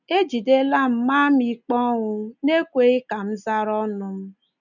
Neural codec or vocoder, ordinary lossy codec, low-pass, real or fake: none; none; 7.2 kHz; real